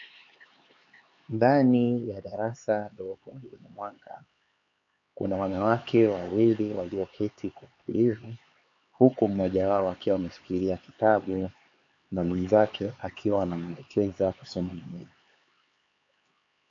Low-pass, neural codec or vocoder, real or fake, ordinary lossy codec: 7.2 kHz; codec, 16 kHz, 4 kbps, X-Codec, HuBERT features, trained on LibriSpeech; fake; AAC, 48 kbps